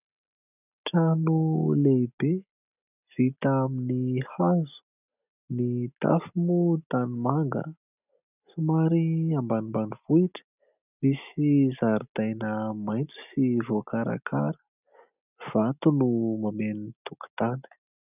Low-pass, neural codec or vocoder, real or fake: 3.6 kHz; none; real